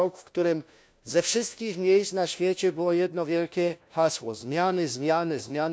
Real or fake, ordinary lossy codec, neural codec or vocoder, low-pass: fake; none; codec, 16 kHz, 1 kbps, FunCodec, trained on LibriTTS, 50 frames a second; none